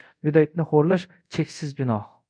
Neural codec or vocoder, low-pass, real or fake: codec, 24 kHz, 0.5 kbps, DualCodec; 9.9 kHz; fake